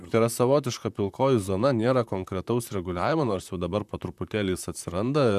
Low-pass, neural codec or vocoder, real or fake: 14.4 kHz; vocoder, 44.1 kHz, 128 mel bands, Pupu-Vocoder; fake